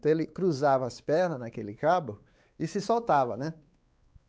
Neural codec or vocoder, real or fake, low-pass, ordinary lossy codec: codec, 16 kHz, 4 kbps, X-Codec, WavLM features, trained on Multilingual LibriSpeech; fake; none; none